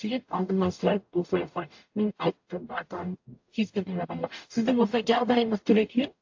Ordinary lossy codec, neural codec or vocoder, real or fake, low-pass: AAC, 48 kbps; codec, 44.1 kHz, 0.9 kbps, DAC; fake; 7.2 kHz